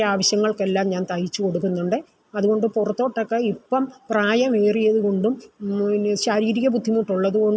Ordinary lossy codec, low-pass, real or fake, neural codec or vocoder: none; none; real; none